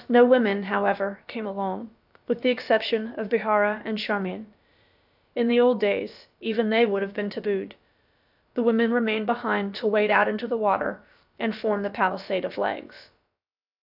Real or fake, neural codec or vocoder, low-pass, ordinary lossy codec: fake; codec, 16 kHz, about 1 kbps, DyCAST, with the encoder's durations; 5.4 kHz; AAC, 48 kbps